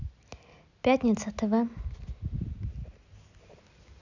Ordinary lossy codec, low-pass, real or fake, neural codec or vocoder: none; 7.2 kHz; real; none